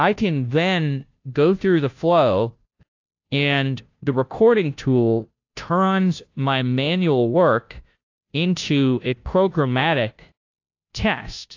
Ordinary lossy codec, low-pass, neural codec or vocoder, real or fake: AAC, 48 kbps; 7.2 kHz; codec, 16 kHz, 0.5 kbps, FunCodec, trained on Chinese and English, 25 frames a second; fake